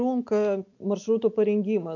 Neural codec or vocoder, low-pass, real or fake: none; 7.2 kHz; real